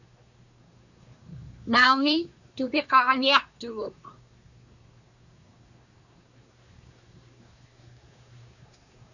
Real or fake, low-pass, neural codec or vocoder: fake; 7.2 kHz; codec, 24 kHz, 1 kbps, SNAC